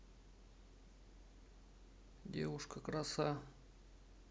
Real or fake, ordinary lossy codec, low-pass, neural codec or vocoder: real; none; none; none